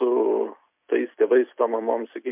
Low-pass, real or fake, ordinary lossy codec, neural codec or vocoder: 3.6 kHz; real; MP3, 32 kbps; none